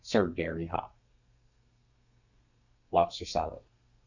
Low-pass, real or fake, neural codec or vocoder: 7.2 kHz; fake; codec, 32 kHz, 1.9 kbps, SNAC